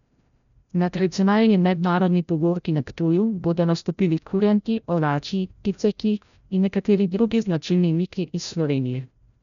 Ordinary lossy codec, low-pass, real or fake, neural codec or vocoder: none; 7.2 kHz; fake; codec, 16 kHz, 0.5 kbps, FreqCodec, larger model